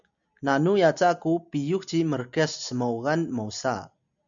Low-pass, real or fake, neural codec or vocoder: 7.2 kHz; real; none